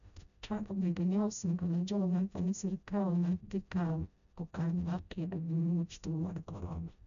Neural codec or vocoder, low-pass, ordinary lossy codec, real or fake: codec, 16 kHz, 0.5 kbps, FreqCodec, smaller model; 7.2 kHz; none; fake